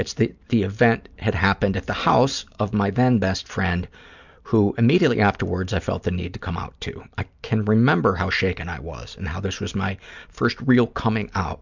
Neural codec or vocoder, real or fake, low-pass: none; real; 7.2 kHz